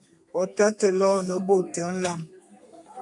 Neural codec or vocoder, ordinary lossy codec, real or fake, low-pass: codec, 32 kHz, 1.9 kbps, SNAC; AAC, 64 kbps; fake; 10.8 kHz